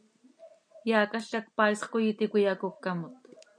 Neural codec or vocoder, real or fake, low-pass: none; real; 9.9 kHz